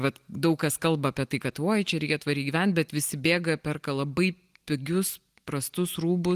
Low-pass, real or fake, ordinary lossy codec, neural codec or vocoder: 14.4 kHz; real; Opus, 24 kbps; none